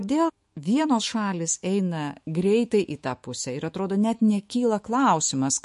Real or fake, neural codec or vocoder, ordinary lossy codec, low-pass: fake; autoencoder, 48 kHz, 128 numbers a frame, DAC-VAE, trained on Japanese speech; MP3, 48 kbps; 14.4 kHz